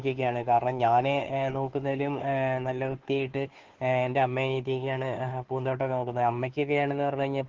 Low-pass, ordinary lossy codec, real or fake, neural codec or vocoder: 7.2 kHz; Opus, 16 kbps; fake; codec, 16 kHz, 2 kbps, FunCodec, trained on Chinese and English, 25 frames a second